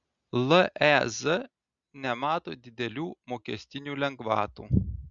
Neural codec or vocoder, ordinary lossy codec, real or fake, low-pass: none; Opus, 64 kbps; real; 7.2 kHz